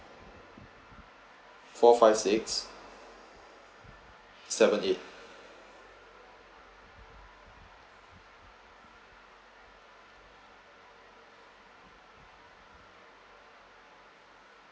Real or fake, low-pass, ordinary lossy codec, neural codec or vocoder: real; none; none; none